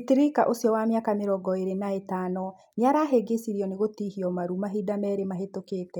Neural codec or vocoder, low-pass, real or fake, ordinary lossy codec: none; 19.8 kHz; real; none